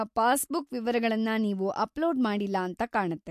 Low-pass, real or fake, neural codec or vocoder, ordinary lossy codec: 14.4 kHz; real; none; MP3, 64 kbps